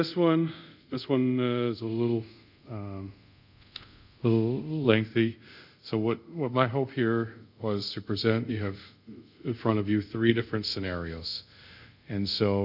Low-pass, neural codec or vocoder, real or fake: 5.4 kHz; codec, 24 kHz, 0.5 kbps, DualCodec; fake